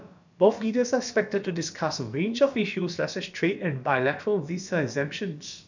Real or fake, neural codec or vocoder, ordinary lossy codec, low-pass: fake; codec, 16 kHz, about 1 kbps, DyCAST, with the encoder's durations; none; 7.2 kHz